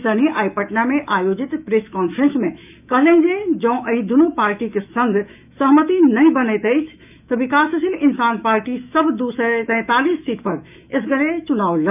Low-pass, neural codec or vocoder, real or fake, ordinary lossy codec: 3.6 kHz; autoencoder, 48 kHz, 128 numbers a frame, DAC-VAE, trained on Japanese speech; fake; none